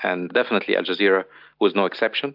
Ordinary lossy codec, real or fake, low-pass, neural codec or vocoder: AAC, 48 kbps; real; 5.4 kHz; none